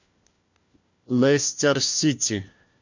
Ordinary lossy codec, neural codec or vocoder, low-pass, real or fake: Opus, 64 kbps; codec, 16 kHz, 1 kbps, FunCodec, trained on LibriTTS, 50 frames a second; 7.2 kHz; fake